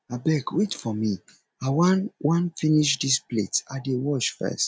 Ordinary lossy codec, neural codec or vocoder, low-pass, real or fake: none; none; none; real